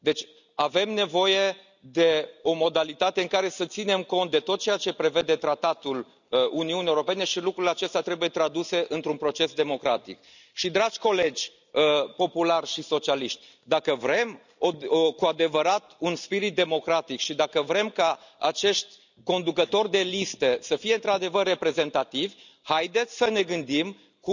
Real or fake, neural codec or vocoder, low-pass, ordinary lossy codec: real; none; 7.2 kHz; none